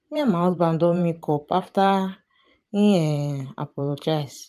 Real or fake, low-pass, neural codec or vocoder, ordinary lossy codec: fake; 14.4 kHz; vocoder, 44.1 kHz, 128 mel bands every 512 samples, BigVGAN v2; none